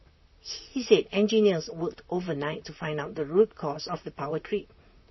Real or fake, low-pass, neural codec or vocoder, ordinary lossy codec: fake; 7.2 kHz; vocoder, 44.1 kHz, 128 mel bands, Pupu-Vocoder; MP3, 24 kbps